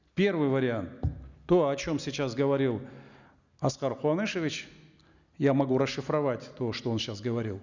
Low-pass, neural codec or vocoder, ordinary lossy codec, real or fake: 7.2 kHz; none; none; real